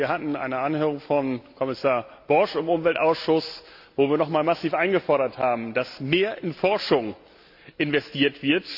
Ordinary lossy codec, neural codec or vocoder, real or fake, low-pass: AAC, 48 kbps; none; real; 5.4 kHz